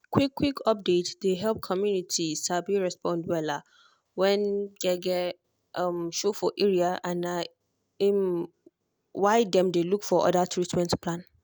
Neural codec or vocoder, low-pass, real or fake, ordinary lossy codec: none; none; real; none